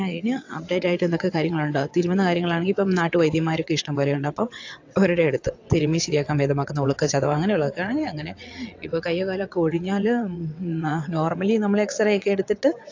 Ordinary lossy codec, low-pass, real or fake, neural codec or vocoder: AAC, 48 kbps; 7.2 kHz; fake; vocoder, 22.05 kHz, 80 mel bands, WaveNeXt